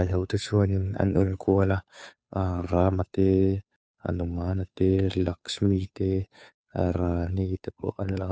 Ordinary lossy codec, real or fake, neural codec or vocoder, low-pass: none; fake; codec, 16 kHz, 2 kbps, FunCodec, trained on Chinese and English, 25 frames a second; none